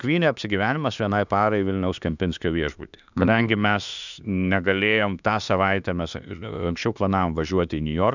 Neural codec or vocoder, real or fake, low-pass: autoencoder, 48 kHz, 32 numbers a frame, DAC-VAE, trained on Japanese speech; fake; 7.2 kHz